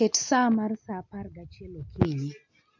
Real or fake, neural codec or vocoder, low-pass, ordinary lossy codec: real; none; 7.2 kHz; MP3, 48 kbps